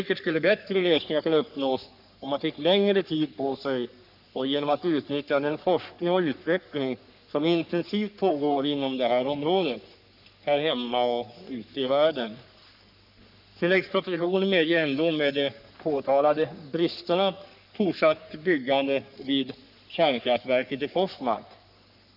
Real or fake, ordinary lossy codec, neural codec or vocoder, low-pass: fake; none; codec, 44.1 kHz, 3.4 kbps, Pupu-Codec; 5.4 kHz